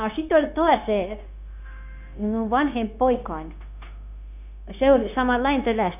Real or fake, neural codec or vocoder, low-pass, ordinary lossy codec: fake; codec, 16 kHz, 0.9 kbps, LongCat-Audio-Codec; 3.6 kHz; none